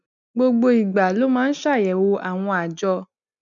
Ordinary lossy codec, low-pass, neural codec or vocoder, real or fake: none; 7.2 kHz; none; real